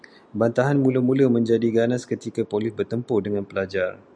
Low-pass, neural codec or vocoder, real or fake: 9.9 kHz; none; real